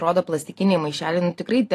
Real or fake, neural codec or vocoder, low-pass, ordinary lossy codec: fake; vocoder, 44.1 kHz, 128 mel bands every 512 samples, BigVGAN v2; 14.4 kHz; AAC, 48 kbps